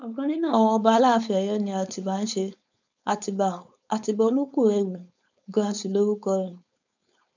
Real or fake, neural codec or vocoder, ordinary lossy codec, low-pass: fake; codec, 16 kHz, 4.8 kbps, FACodec; none; 7.2 kHz